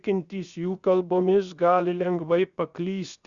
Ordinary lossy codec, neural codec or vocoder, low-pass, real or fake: Opus, 64 kbps; codec, 16 kHz, 0.7 kbps, FocalCodec; 7.2 kHz; fake